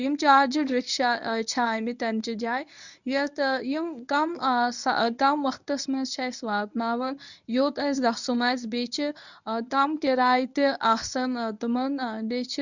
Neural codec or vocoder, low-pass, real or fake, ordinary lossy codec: codec, 24 kHz, 0.9 kbps, WavTokenizer, medium speech release version 1; 7.2 kHz; fake; none